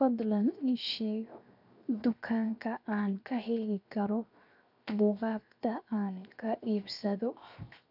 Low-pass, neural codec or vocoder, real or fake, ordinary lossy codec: 5.4 kHz; codec, 16 kHz, 0.7 kbps, FocalCodec; fake; none